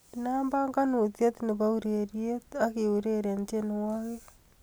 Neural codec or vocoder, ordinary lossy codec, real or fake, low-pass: none; none; real; none